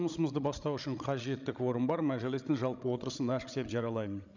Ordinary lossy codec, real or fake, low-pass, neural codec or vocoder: none; fake; 7.2 kHz; codec, 16 kHz, 16 kbps, FreqCodec, larger model